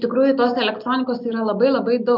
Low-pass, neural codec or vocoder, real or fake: 5.4 kHz; none; real